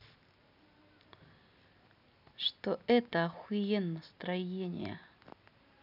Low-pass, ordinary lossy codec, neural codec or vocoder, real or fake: 5.4 kHz; none; none; real